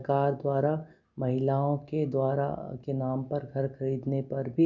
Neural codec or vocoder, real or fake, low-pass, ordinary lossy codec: none; real; 7.2 kHz; none